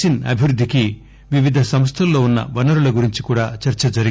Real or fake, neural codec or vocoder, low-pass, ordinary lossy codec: real; none; none; none